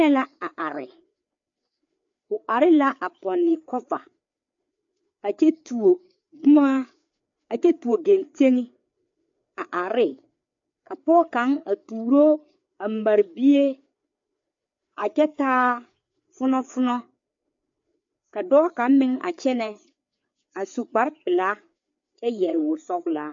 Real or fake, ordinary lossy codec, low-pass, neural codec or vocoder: fake; MP3, 48 kbps; 7.2 kHz; codec, 16 kHz, 4 kbps, FreqCodec, larger model